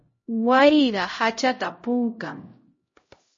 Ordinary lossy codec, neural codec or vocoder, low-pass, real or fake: MP3, 32 kbps; codec, 16 kHz, 0.5 kbps, X-Codec, HuBERT features, trained on LibriSpeech; 7.2 kHz; fake